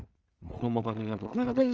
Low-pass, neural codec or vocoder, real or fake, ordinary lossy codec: 7.2 kHz; codec, 16 kHz in and 24 kHz out, 0.4 kbps, LongCat-Audio-Codec, two codebook decoder; fake; Opus, 24 kbps